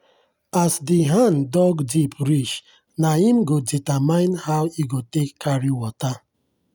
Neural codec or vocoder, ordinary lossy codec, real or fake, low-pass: none; none; real; none